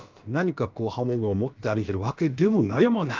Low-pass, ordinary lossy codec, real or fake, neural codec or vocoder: 7.2 kHz; Opus, 24 kbps; fake; codec, 16 kHz, about 1 kbps, DyCAST, with the encoder's durations